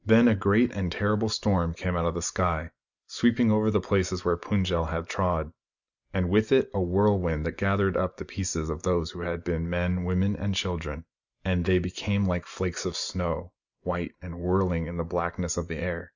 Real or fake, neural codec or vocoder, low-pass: real; none; 7.2 kHz